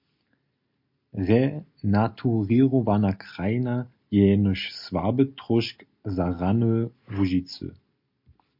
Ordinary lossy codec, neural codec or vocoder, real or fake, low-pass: MP3, 48 kbps; none; real; 5.4 kHz